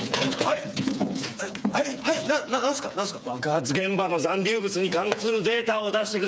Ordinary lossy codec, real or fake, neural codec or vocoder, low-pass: none; fake; codec, 16 kHz, 4 kbps, FreqCodec, smaller model; none